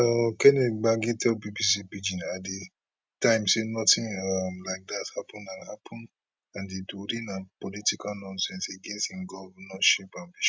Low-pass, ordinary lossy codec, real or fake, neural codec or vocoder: none; none; real; none